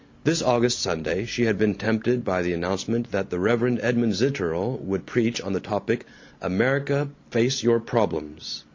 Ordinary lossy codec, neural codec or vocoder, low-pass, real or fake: MP3, 48 kbps; none; 7.2 kHz; real